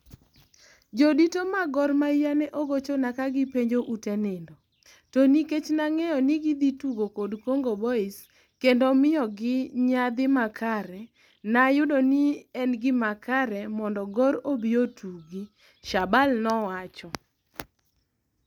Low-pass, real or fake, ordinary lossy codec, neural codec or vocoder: 19.8 kHz; real; none; none